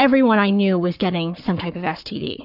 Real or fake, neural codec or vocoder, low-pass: fake; codec, 44.1 kHz, 7.8 kbps, Pupu-Codec; 5.4 kHz